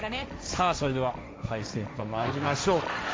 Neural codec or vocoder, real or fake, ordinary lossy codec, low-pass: codec, 16 kHz, 1.1 kbps, Voila-Tokenizer; fake; none; none